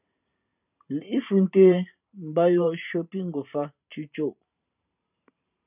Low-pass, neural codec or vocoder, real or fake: 3.6 kHz; vocoder, 44.1 kHz, 128 mel bands every 512 samples, BigVGAN v2; fake